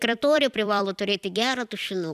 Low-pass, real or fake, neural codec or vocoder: 14.4 kHz; fake; vocoder, 44.1 kHz, 128 mel bands every 256 samples, BigVGAN v2